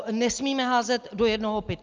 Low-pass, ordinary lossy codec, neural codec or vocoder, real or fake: 7.2 kHz; Opus, 24 kbps; none; real